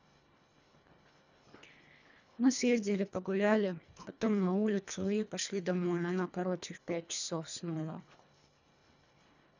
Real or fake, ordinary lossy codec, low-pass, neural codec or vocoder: fake; none; 7.2 kHz; codec, 24 kHz, 1.5 kbps, HILCodec